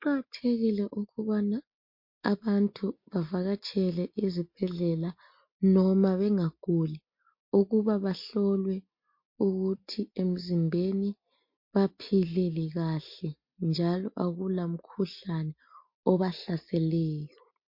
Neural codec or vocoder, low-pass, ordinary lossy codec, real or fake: none; 5.4 kHz; MP3, 32 kbps; real